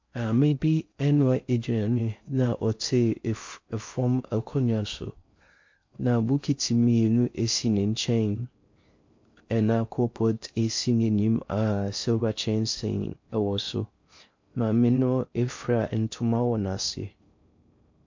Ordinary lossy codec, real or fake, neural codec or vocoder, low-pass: MP3, 48 kbps; fake; codec, 16 kHz in and 24 kHz out, 0.6 kbps, FocalCodec, streaming, 4096 codes; 7.2 kHz